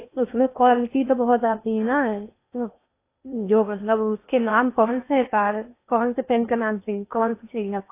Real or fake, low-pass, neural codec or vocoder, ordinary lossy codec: fake; 3.6 kHz; codec, 16 kHz in and 24 kHz out, 0.8 kbps, FocalCodec, streaming, 65536 codes; AAC, 24 kbps